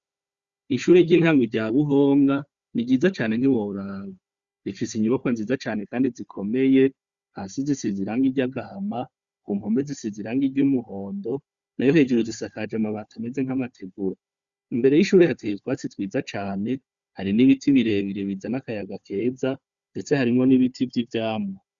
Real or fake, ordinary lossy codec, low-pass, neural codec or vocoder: fake; Opus, 64 kbps; 7.2 kHz; codec, 16 kHz, 4 kbps, FunCodec, trained on Chinese and English, 50 frames a second